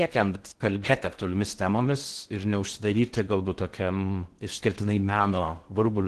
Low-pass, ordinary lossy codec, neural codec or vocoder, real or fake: 10.8 kHz; Opus, 16 kbps; codec, 16 kHz in and 24 kHz out, 0.6 kbps, FocalCodec, streaming, 4096 codes; fake